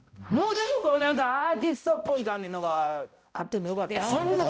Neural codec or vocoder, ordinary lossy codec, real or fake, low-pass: codec, 16 kHz, 0.5 kbps, X-Codec, HuBERT features, trained on balanced general audio; none; fake; none